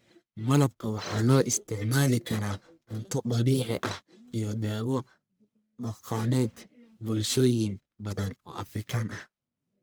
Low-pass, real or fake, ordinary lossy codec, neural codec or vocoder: none; fake; none; codec, 44.1 kHz, 1.7 kbps, Pupu-Codec